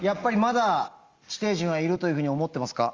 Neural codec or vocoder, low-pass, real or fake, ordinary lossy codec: none; 7.2 kHz; real; Opus, 32 kbps